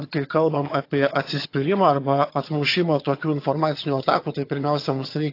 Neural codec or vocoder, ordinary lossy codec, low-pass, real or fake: vocoder, 22.05 kHz, 80 mel bands, HiFi-GAN; AAC, 32 kbps; 5.4 kHz; fake